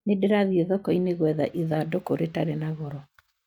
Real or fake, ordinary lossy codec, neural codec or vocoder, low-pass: real; none; none; 19.8 kHz